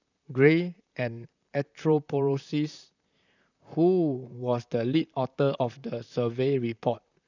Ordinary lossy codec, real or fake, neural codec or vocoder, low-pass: none; fake; vocoder, 44.1 kHz, 128 mel bands, Pupu-Vocoder; 7.2 kHz